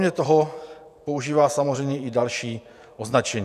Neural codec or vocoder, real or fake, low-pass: vocoder, 48 kHz, 128 mel bands, Vocos; fake; 14.4 kHz